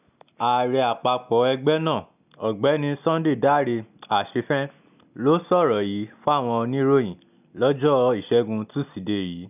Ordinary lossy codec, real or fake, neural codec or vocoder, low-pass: none; real; none; 3.6 kHz